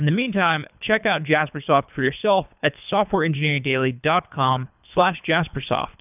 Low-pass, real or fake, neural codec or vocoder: 3.6 kHz; fake; codec, 24 kHz, 3 kbps, HILCodec